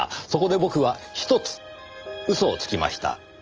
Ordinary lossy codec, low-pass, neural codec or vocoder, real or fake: Opus, 24 kbps; 7.2 kHz; none; real